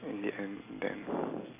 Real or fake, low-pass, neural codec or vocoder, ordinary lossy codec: real; 3.6 kHz; none; AAC, 16 kbps